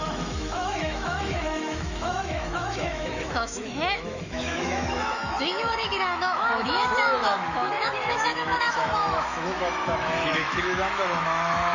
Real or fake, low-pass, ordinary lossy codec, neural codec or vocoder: fake; 7.2 kHz; Opus, 64 kbps; autoencoder, 48 kHz, 128 numbers a frame, DAC-VAE, trained on Japanese speech